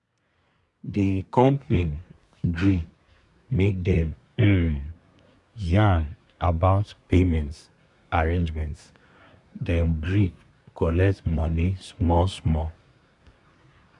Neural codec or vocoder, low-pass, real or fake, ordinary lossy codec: codec, 24 kHz, 1 kbps, SNAC; 10.8 kHz; fake; none